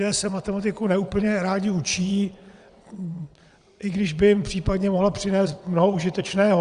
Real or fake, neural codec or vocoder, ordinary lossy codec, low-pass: fake; vocoder, 22.05 kHz, 80 mel bands, WaveNeXt; Opus, 64 kbps; 9.9 kHz